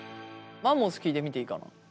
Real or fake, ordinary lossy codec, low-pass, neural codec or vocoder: real; none; none; none